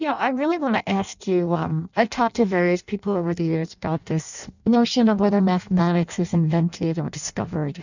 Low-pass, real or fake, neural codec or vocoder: 7.2 kHz; fake; codec, 16 kHz in and 24 kHz out, 0.6 kbps, FireRedTTS-2 codec